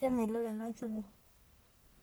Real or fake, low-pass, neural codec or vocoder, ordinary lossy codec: fake; none; codec, 44.1 kHz, 1.7 kbps, Pupu-Codec; none